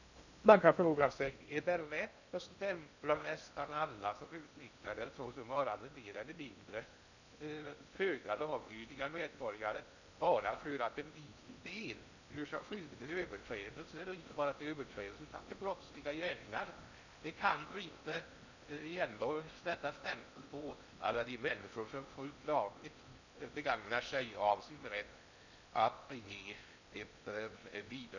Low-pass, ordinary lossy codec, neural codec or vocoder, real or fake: 7.2 kHz; none; codec, 16 kHz in and 24 kHz out, 0.6 kbps, FocalCodec, streaming, 2048 codes; fake